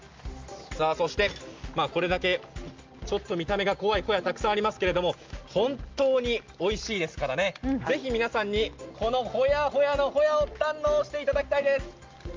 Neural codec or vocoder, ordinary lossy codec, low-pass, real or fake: none; Opus, 32 kbps; 7.2 kHz; real